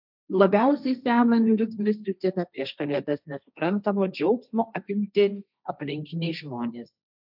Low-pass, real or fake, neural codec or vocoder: 5.4 kHz; fake; codec, 16 kHz, 1.1 kbps, Voila-Tokenizer